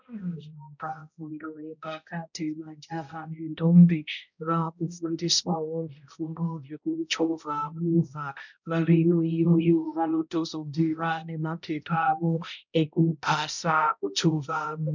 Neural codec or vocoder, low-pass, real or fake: codec, 16 kHz, 0.5 kbps, X-Codec, HuBERT features, trained on balanced general audio; 7.2 kHz; fake